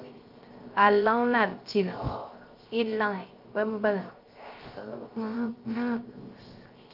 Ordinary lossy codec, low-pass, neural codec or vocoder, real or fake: Opus, 24 kbps; 5.4 kHz; codec, 16 kHz, 0.3 kbps, FocalCodec; fake